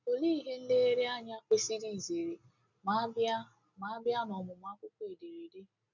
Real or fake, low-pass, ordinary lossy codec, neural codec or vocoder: real; 7.2 kHz; none; none